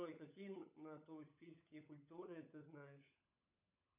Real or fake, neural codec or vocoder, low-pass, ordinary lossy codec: fake; codec, 16 kHz, 16 kbps, FunCodec, trained on LibriTTS, 50 frames a second; 3.6 kHz; MP3, 32 kbps